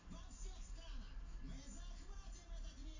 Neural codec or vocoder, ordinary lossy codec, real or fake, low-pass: none; AAC, 32 kbps; real; 7.2 kHz